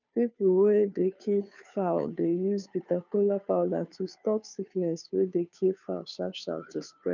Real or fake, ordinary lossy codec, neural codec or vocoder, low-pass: fake; none; codec, 16 kHz, 2 kbps, FunCodec, trained on Chinese and English, 25 frames a second; 7.2 kHz